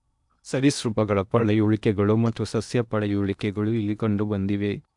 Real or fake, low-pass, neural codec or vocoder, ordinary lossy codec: fake; 10.8 kHz; codec, 16 kHz in and 24 kHz out, 0.8 kbps, FocalCodec, streaming, 65536 codes; none